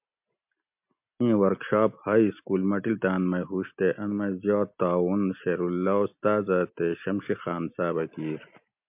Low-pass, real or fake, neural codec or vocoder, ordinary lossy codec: 3.6 kHz; real; none; MP3, 32 kbps